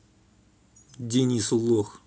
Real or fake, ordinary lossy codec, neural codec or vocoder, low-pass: real; none; none; none